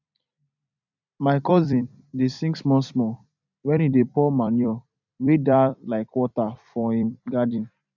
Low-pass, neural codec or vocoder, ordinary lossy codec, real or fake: 7.2 kHz; vocoder, 44.1 kHz, 128 mel bands every 256 samples, BigVGAN v2; none; fake